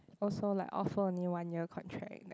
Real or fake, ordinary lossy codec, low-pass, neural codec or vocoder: real; none; none; none